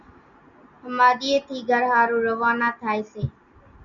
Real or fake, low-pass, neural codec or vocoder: real; 7.2 kHz; none